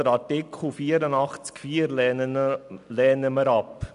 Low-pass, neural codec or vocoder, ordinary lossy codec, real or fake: 10.8 kHz; none; AAC, 96 kbps; real